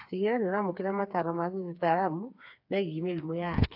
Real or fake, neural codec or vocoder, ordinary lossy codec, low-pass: fake; codec, 16 kHz, 4 kbps, FreqCodec, smaller model; AAC, 48 kbps; 5.4 kHz